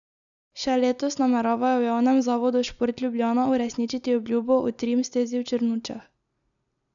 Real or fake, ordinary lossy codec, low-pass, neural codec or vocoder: real; none; 7.2 kHz; none